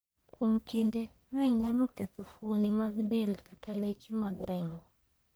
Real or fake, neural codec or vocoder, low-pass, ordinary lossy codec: fake; codec, 44.1 kHz, 1.7 kbps, Pupu-Codec; none; none